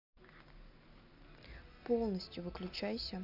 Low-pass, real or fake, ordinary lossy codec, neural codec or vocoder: 5.4 kHz; real; none; none